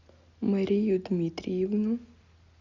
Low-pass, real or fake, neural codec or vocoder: 7.2 kHz; real; none